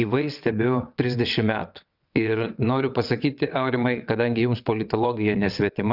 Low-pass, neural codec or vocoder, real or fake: 5.4 kHz; vocoder, 22.05 kHz, 80 mel bands, WaveNeXt; fake